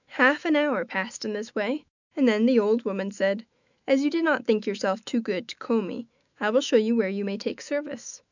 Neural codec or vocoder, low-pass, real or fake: autoencoder, 48 kHz, 128 numbers a frame, DAC-VAE, trained on Japanese speech; 7.2 kHz; fake